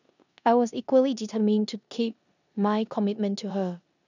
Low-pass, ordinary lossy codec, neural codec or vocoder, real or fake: 7.2 kHz; none; codec, 16 kHz in and 24 kHz out, 0.9 kbps, LongCat-Audio-Codec, four codebook decoder; fake